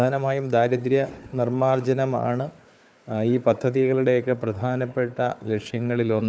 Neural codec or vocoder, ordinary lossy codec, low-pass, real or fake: codec, 16 kHz, 4 kbps, FunCodec, trained on Chinese and English, 50 frames a second; none; none; fake